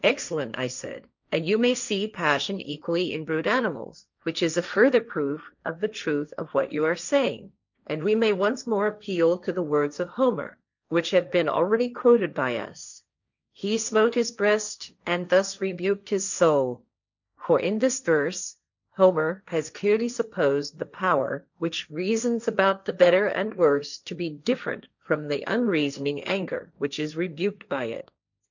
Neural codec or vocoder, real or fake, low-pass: codec, 16 kHz, 1.1 kbps, Voila-Tokenizer; fake; 7.2 kHz